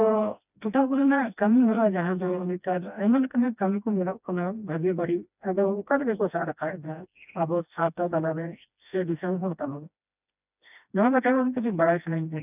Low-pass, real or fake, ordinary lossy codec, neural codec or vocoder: 3.6 kHz; fake; none; codec, 16 kHz, 1 kbps, FreqCodec, smaller model